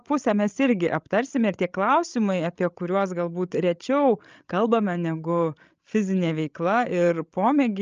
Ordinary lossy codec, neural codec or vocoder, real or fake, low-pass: Opus, 24 kbps; codec, 16 kHz, 16 kbps, FreqCodec, larger model; fake; 7.2 kHz